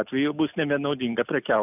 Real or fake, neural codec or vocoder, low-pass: real; none; 3.6 kHz